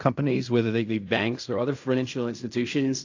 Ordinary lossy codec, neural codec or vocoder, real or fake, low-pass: AAC, 48 kbps; codec, 16 kHz in and 24 kHz out, 0.4 kbps, LongCat-Audio-Codec, fine tuned four codebook decoder; fake; 7.2 kHz